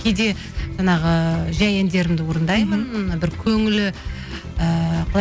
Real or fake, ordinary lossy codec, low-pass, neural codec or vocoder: real; none; none; none